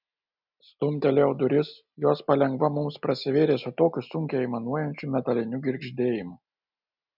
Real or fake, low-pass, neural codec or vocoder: real; 5.4 kHz; none